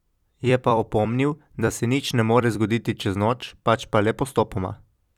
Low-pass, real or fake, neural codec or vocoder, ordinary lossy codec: 19.8 kHz; fake; vocoder, 44.1 kHz, 128 mel bands every 256 samples, BigVGAN v2; none